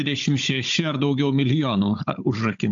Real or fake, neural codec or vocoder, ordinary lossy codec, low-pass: fake; codec, 16 kHz, 16 kbps, FunCodec, trained on Chinese and English, 50 frames a second; AAC, 64 kbps; 7.2 kHz